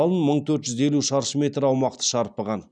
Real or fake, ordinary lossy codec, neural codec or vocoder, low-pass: real; none; none; 9.9 kHz